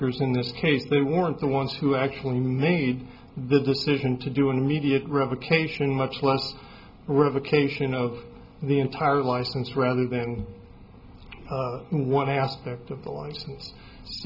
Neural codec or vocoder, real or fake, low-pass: none; real; 5.4 kHz